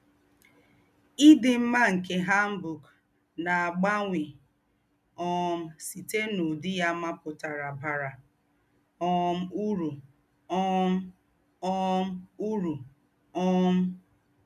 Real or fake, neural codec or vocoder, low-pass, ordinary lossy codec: real; none; 14.4 kHz; none